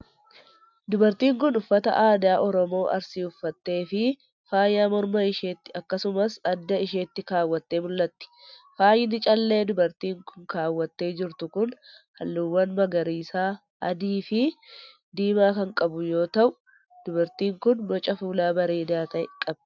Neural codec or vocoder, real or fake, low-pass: none; real; 7.2 kHz